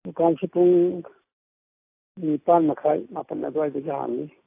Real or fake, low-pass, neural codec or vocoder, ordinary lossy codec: real; 3.6 kHz; none; AAC, 24 kbps